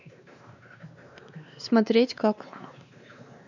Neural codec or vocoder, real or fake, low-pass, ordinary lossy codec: codec, 16 kHz, 2 kbps, X-Codec, WavLM features, trained on Multilingual LibriSpeech; fake; 7.2 kHz; none